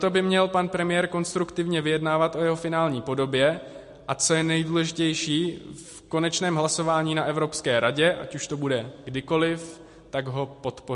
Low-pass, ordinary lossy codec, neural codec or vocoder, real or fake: 10.8 kHz; MP3, 48 kbps; none; real